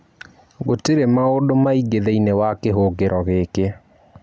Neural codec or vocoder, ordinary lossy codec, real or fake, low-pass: none; none; real; none